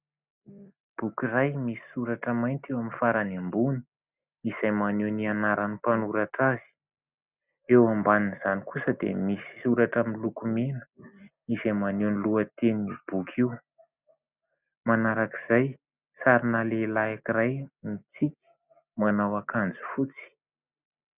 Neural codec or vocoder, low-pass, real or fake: none; 3.6 kHz; real